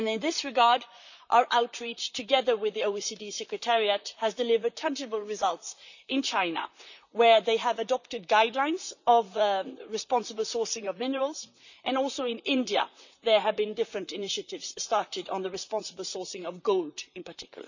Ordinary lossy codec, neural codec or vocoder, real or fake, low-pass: none; vocoder, 44.1 kHz, 128 mel bands, Pupu-Vocoder; fake; 7.2 kHz